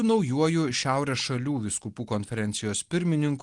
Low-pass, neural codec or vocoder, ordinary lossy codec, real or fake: 10.8 kHz; none; Opus, 24 kbps; real